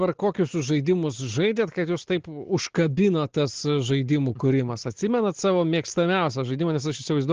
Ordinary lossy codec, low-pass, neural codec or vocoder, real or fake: Opus, 16 kbps; 7.2 kHz; none; real